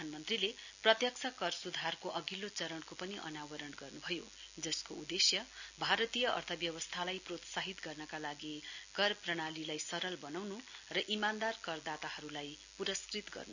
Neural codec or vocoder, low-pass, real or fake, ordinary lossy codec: none; 7.2 kHz; real; none